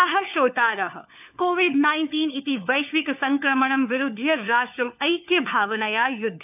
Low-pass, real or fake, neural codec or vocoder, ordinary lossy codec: 3.6 kHz; fake; codec, 16 kHz, 4 kbps, FunCodec, trained on LibriTTS, 50 frames a second; none